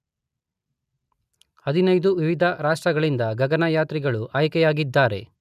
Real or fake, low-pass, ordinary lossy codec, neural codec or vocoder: real; 14.4 kHz; none; none